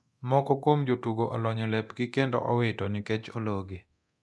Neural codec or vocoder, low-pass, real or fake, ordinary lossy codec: codec, 24 kHz, 0.9 kbps, DualCodec; none; fake; none